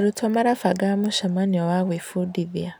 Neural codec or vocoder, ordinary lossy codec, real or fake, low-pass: none; none; real; none